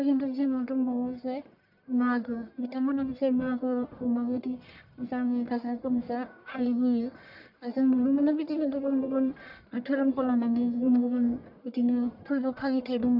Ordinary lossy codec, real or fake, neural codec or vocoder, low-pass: none; fake; codec, 44.1 kHz, 1.7 kbps, Pupu-Codec; 5.4 kHz